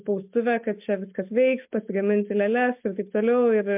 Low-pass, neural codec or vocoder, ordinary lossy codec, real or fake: 3.6 kHz; none; MP3, 32 kbps; real